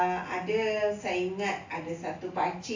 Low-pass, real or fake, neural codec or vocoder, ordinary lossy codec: 7.2 kHz; real; none; AAC, 48 kbps